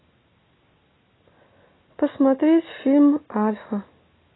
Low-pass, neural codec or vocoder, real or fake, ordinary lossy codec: 7.2 kHz; none; real; AAC, 16 kbps